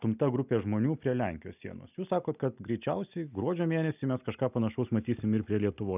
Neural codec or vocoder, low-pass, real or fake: none; 3.6 kHz; real